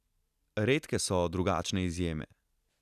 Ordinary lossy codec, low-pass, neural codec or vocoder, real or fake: none; 14.4 kHz; none; real